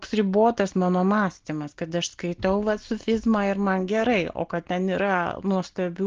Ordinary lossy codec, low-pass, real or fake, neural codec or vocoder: Opus, 16 kbps; 7.2 kHz; real; none